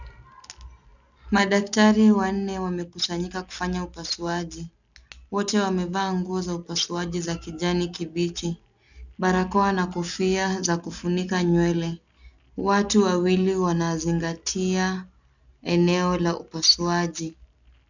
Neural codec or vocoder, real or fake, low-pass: none; real; 7.2 kHz